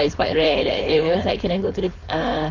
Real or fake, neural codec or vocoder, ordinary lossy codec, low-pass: fake; codec, 16 kHz, 4.8 kbps, FACodec; none; 7.2 kHz